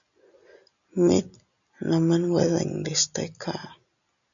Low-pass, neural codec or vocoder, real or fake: 7.2 kHz; none; real